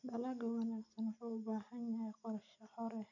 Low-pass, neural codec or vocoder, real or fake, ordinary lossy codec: 7.2 kHz; none; real; none